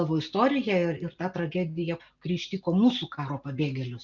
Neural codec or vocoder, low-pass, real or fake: none; 7.2 kHz; real